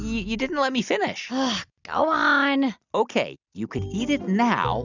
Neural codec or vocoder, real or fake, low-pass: none; real; 7.2 kHz